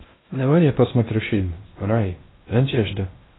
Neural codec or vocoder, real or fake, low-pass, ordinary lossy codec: codec, 16 kHz in and 24 kHz out, 0.6 kbps, FocalCodec, streaming, 2048 codes; fake; 7.2 kHz; AAC, 16 kbps